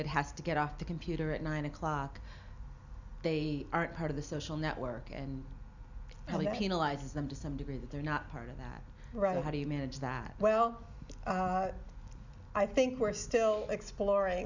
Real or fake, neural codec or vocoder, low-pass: real; none; 7.2 kHz